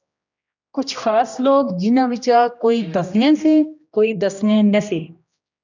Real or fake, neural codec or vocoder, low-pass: fake; codec, 16 kHz, 1 kbps, X-Codec, HuBERT features, trained on general audio; 7.2 kHz